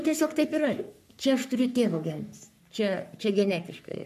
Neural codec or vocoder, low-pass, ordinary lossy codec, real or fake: codec, 44.1 kHz, 3.4 kbps, Pupu-Codec; 14.4 kHz; MP3, 96 kbps; fake